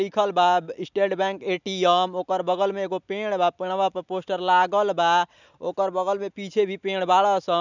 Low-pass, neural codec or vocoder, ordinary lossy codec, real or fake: 7.2 kHz; none; none; real